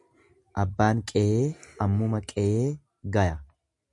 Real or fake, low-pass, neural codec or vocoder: real; 10.8 kHz; none